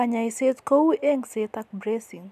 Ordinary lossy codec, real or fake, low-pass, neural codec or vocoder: none; real; 14.4 kHz; none